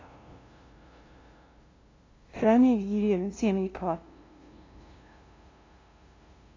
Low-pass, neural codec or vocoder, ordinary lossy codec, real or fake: 7.2 kHz; codec, 16 kHz, 0.5 kbps, FunCodec, trained on LibriTTS, 25 frames a second; AAC, 32 kbps; fake